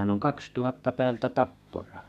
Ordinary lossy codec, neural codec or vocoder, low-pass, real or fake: none; codec, 32 kHz, 1.9 kbps, SNAC; 14.4 kHz; fake